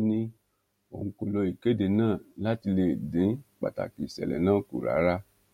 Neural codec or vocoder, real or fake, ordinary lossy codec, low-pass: none; real; MP3, 64 kbps; 19.8 kHz